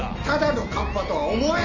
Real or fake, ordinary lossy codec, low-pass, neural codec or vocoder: real; none; 7.2 kHz; none